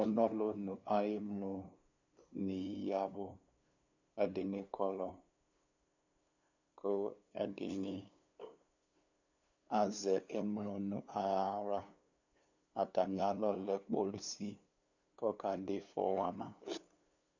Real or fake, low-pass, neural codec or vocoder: fake; 7.2 kHz; codec, 16 kHz, 2 kbps, FunCodec, trained on LibriTTS, 25 frames a second